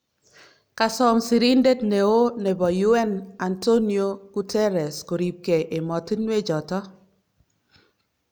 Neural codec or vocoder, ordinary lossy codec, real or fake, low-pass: none; none; real; none